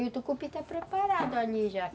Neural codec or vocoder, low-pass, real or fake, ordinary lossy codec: none; none; real; none